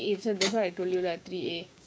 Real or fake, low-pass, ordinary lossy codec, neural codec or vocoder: fake; none; none; codec, 16 kHz, 6 kbps, DAC